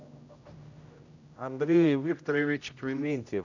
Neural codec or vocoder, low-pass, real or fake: codec, 16 kHz, 0.5 kbps, X-Codec, HuBERT features, trained on general audio; 7.2 kHz; fake